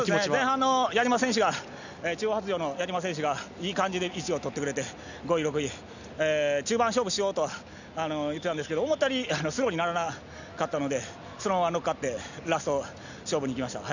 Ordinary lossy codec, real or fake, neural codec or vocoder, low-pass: none; real; none; 7.2 kHz